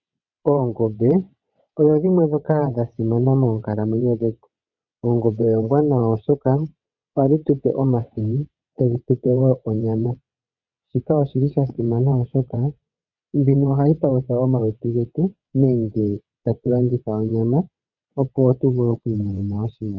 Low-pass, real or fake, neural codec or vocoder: 7.2 kHz; fake; vocoder, 22.05 kHz, 80 mel bands, WaveNeXt